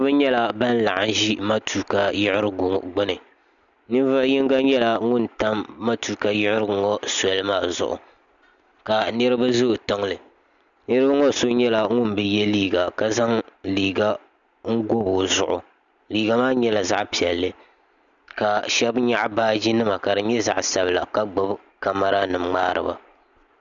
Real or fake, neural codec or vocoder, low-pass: real; none; 7.2 kHz